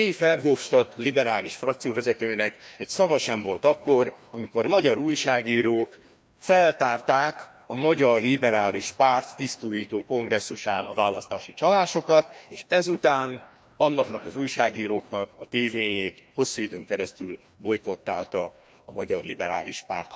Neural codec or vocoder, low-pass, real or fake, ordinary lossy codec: codec, 16 kHz, 1 kbps, FreqCodec, larger model; none; fake; none